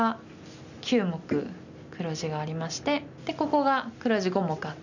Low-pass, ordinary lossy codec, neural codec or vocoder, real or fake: 7.2 kHz; none; none; real